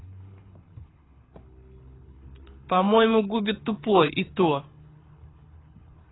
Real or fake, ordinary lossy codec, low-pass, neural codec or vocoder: fake; AAC, 16 kbps; 7.2 kHz; codec, 24 kHz, 6 kbps, HILCodec